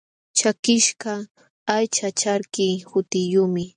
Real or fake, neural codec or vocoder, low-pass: real; none; 9.9 kHz